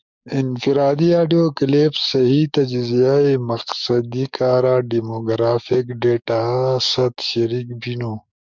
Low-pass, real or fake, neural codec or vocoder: 7.2 kHz; fake; codec, 44.1 kHz, 7.8 kbps, DAC